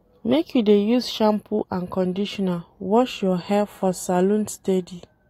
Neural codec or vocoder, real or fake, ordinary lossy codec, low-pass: none; real; AAC, 48 kbps; 19.8 kHz